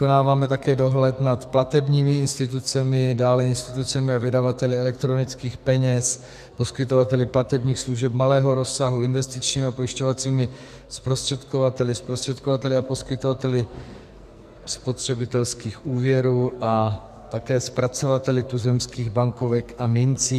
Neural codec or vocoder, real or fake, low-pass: codec, 44.1 kHz, 2.6 kbps, SNAC; fake; 14.4 kHz